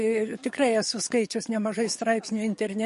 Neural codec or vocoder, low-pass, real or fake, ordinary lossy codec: codec, 44.1 kHz, 7.8 kbps, DAC; 14.4 kHz; fake; MP3, 48 kbps